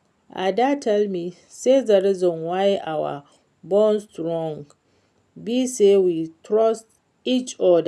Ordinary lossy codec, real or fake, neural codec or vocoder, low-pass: none; real; none; none